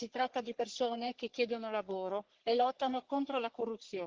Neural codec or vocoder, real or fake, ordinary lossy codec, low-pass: codec, 44.1 kHz, 3.4 kbps, Pupu-Codec; fake; Opus, 16 kbps; 7.2 kHz